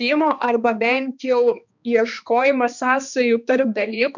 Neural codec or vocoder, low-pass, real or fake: codec, 16 kHz, 2 kbps, X-Codec, HuBERT features, trained on balanced general audio; 7.2 kHz; fake